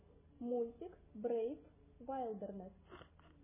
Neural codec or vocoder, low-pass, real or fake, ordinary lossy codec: none; 3.6 kHz; real; MP3, 16 kbps